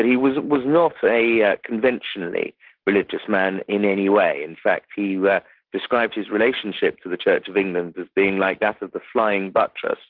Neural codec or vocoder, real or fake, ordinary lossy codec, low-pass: none; real; Opus, 16 kbps; 5.4 kHz